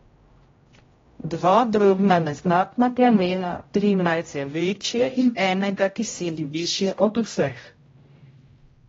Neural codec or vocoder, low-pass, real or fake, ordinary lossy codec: codec, 16 kHz, 0.5 kbps, X-Codec, HuBERT features, trained on general audio; 7.2 kHz; fake; AAC, 24 kbps